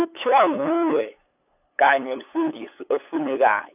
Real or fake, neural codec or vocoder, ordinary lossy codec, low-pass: fake; codec, 16 kHz, 8 kbps, FunCodec, trained on LibriTTS, 25 frames a second; none; 3.6 kHz